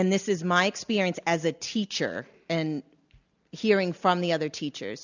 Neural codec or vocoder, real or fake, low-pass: none; real; 7.2 kHz